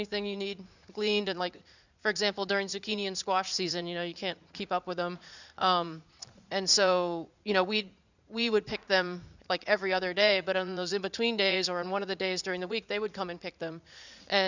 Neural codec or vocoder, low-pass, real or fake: vocoder, 44.1 kHz, 80 mel bands, Vocos; 7.2 kHz; fake